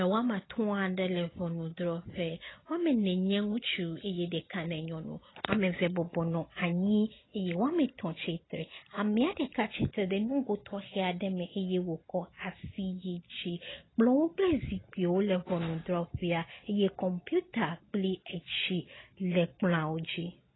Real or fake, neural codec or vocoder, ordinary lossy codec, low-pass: real; none; AAC, 16 kbps; 7.2 kHz